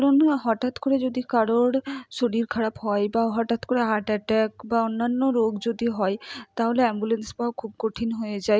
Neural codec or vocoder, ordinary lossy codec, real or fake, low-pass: none; none; real; none